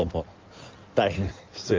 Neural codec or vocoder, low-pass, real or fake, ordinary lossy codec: codec, 16 kHz in and 24 kHz out, 1.1 kbps, FireRedTTS-2 codec; 7.2 kHz; fake; Opus, 24 kbps